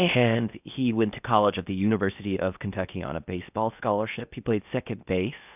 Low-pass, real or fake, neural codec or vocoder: 3.6 kHz; fake; codec, 16 kHz in and 24 kHz out, 0.6 kbps, FocalCodec, streaming, 4096 codes